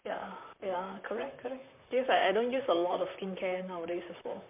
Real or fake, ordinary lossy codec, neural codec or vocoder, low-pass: fake; MP3, 32 kbps; vocoder, 44.1 kHz, 128 mel bands, Pupu-Vocoder; 3.6 kHz